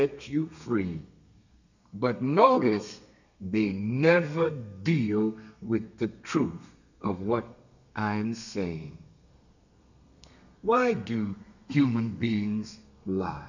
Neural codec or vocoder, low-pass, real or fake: codec, 32 kHz, 1.9 kbps, SNAC; 7.2 kHz; fake